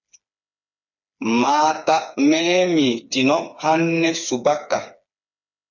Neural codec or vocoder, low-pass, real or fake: codec, 16 kHz, 4 kbps, FreqCodec, smaller model; 7.2 kHz; fake